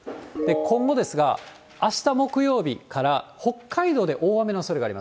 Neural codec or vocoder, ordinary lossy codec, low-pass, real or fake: none; none; none; real